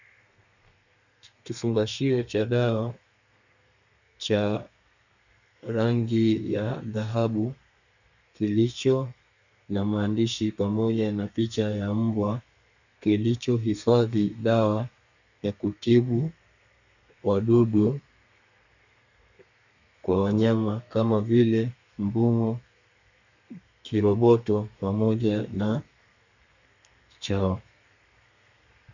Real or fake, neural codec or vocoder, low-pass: fake; codec, 32 kHz, 1.9 kbps, SNAC; 7.2 kHz